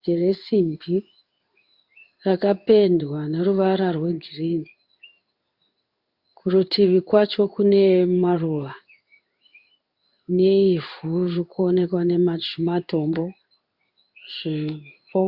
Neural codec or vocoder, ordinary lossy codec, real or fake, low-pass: codec, 16 kHz in and 24 kHz out, 1 kbps, XY-Tokenizer; Opus, 64 kbps; fake; 5.4 kHz